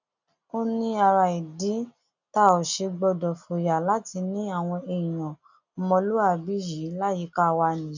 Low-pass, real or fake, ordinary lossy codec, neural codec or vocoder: 7.2 kHz; real; none; none